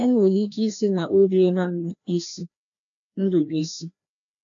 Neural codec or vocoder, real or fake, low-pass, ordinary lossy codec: codec, 16 kHz, 1 kbps, FreqCodec, larger model; fake; 7.2 kHz; AAC, 64 kbps